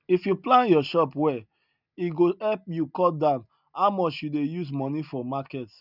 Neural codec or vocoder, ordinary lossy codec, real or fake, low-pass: none; none; real; 5.4 kHz